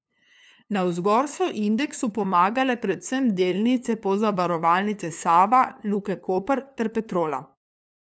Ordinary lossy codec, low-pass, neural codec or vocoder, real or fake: none; none; codec, 16 kHz, 2 kbps, FunCodec, trained on LibriTTS, 25 frames a second; fake